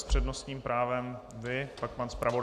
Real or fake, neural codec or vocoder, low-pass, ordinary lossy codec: real; none; 14.4 kHz; Opus, 64 kbps